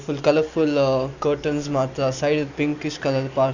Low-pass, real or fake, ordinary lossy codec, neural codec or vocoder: 7.2 kHz; real; none; none